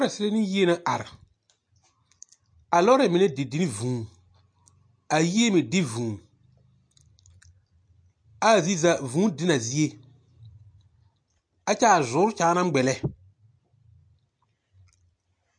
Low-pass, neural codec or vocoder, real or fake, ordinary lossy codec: 9.9 kHz; none; real; MP3, 48 kbps